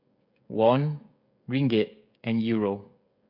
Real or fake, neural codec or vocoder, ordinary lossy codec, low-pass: fake; codec, 16 kHz, 16 kbps, FreqCodec, smaller model; MP3, 48 kbps; 5.4 kHz